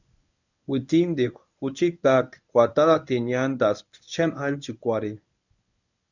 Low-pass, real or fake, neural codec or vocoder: 7.2 kHz; fake; codec, 24 kHz, 0.9 kbps, WavTokenizer, medium speech release version 1